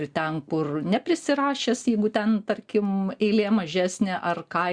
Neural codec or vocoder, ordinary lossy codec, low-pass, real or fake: none; Opus, 64 kbps; 9.9 kHz; real